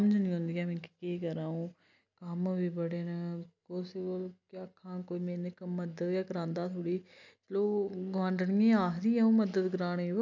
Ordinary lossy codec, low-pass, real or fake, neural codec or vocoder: none; 7.2 kHz; real; none